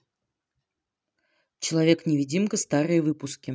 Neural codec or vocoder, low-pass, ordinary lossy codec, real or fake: none; none; none; real